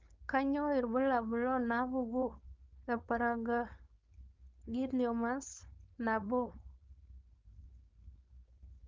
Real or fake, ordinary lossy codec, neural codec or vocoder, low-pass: fake; Opus, 24 kbps; codec, 16 kHz, 4.8 kbps, FACodec; 7.2 kHz